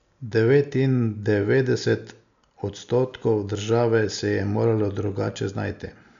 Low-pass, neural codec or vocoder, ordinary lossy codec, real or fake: 7.2 kHz; none; none; real